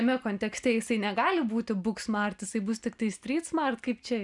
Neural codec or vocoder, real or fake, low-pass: none; real; 10.8 kHz